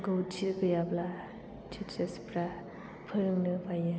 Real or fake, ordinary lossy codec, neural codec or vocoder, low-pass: real; none; none; none